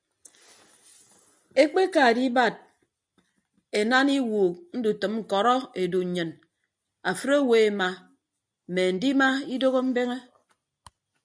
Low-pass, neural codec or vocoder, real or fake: 9.9 kHz; none; real